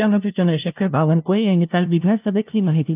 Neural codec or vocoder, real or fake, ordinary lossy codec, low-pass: codec, 16 kHz, 0.5 kbps, FunCodec, trained on LibriTTS, 25 frames a second; fake; Opus, 24 kbps; 3.6 kHz